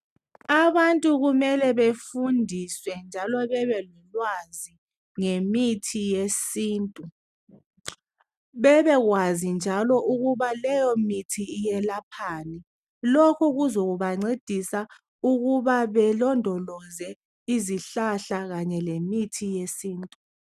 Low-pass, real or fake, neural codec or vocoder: 14.4 kHz; real; none